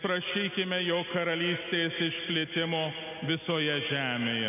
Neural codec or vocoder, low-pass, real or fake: none; 3.6 kHz; real